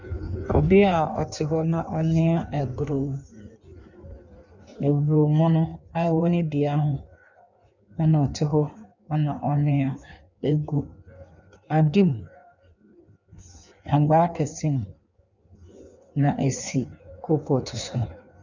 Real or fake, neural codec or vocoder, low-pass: fake; codec, 16 kHz in and 24 kHz out, 1.1 kbps, FireRedTTS-2 codec; 7.2 kHz